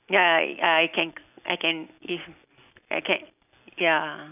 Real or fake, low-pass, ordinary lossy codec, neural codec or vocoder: real; 3.6 kHz; none; none